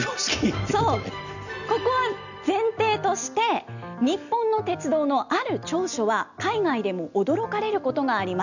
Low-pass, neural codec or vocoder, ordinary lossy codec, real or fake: 7.2 kHz; none; none; real